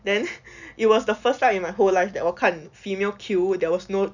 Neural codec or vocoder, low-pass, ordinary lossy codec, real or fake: none; 7.2 kHz; none; real